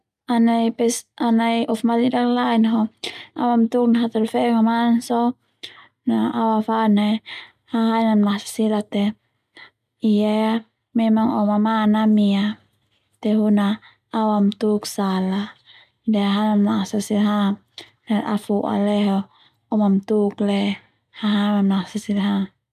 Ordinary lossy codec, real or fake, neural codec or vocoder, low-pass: none; real; none; 14.4 kHz